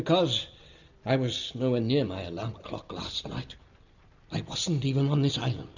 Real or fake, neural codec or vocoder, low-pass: real; none; 7.2 kHz